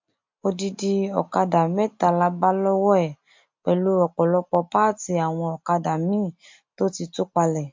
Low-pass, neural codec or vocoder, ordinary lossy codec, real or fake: 7.2 kHz; none; MP3, 48 kbps; real